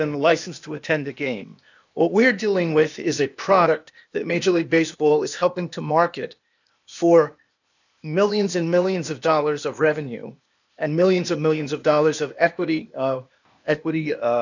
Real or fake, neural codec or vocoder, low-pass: fake; codec, 16 kHz, 0.8 kbps, ZipCodec; 7.2 kHz